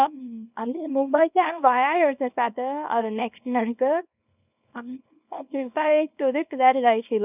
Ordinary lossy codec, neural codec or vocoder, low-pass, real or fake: none; codec, 24 kHz, 0.9 kbps, WavTokenizer, small release; 3.6 kHz; fake